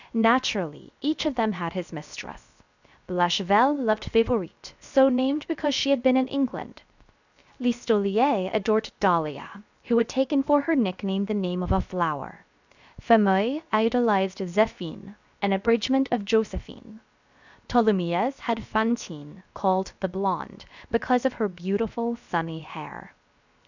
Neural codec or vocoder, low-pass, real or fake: codec, 16 kHz, 0.7 kbps, FocalCodec; 7.2 kHz; fake